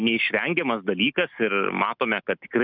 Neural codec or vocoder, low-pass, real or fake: none; 5.4 kHz; real